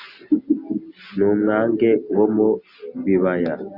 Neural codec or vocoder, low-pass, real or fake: none; 5.4 kHz; real